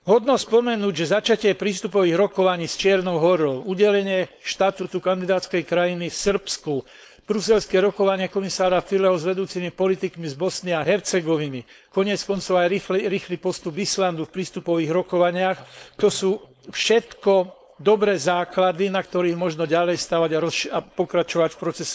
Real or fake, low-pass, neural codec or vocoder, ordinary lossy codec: fake; none; codec, 16 kHz, 4.8 kbps, FACodec; none